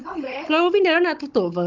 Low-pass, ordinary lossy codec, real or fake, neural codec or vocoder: 7.2 kHz; Opus, 32 kbps; fake; codec, 16 kHz, 16 kbps, FunCodec, trained on Chinese and English, 50 frames a second